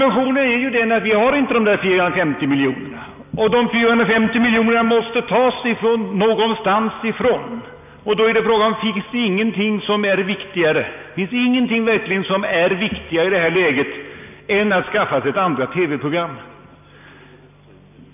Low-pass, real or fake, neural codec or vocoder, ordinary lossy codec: 3.6 kHz; real; none; none